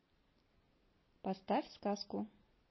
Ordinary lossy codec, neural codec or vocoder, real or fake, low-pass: MP3, 24 kbps; none; real; 7.2 kHz